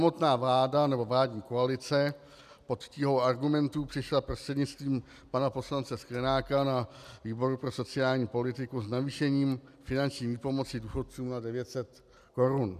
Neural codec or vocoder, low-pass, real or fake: none; 14.4 kHz; real